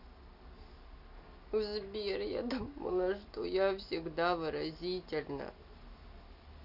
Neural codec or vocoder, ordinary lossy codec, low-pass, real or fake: none; none; 5.4 kHz; real